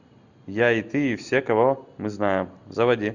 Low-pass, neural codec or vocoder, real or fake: 7.2 kHz; none; real